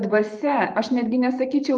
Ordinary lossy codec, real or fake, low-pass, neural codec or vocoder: Opus, 32 kbps; fake; 9.9 kHz; vocoder, 44.1 kHz, 128 mel bands every 512 samples, BigVGAN v2